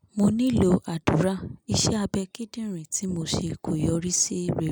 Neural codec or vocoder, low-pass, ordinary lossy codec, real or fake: none; none; none; real